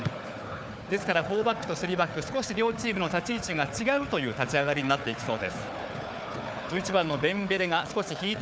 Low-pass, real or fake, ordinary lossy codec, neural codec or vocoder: none; fake; none; codec, 16 kHz, 4 kbps, FunCodec, trained on Chinese and English, 50 frames a second